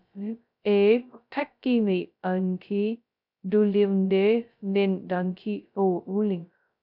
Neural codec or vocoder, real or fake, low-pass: codec, 16 kHz, 0.2 kbps, FocalCodec; fake; 5.4 kHz